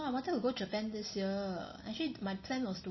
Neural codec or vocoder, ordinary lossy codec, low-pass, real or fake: none; MP3, 24 kbps; 7.2 kHz; real